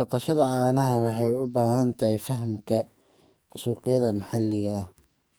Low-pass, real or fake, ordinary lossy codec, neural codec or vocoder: none; fake; none; codec, 44.1 kHz, 2.6 kbps, SNAC